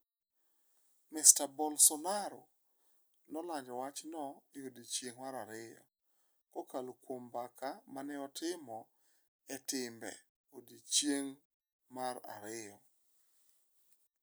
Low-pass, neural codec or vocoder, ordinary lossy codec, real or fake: none; none; none; real